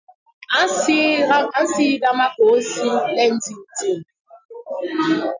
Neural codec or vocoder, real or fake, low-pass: none; real; 7.2 kHz